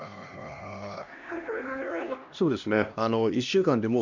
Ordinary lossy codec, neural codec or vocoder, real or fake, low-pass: none; codec, 16 kHz, 1 kbps, X-Codec, HuBERT features, trained on LibriSpeech; fake; 7.2 kHz